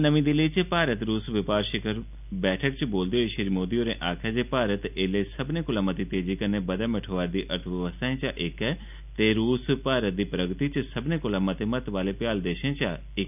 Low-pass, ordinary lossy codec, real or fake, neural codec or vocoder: 3.6 kHz; none; real; none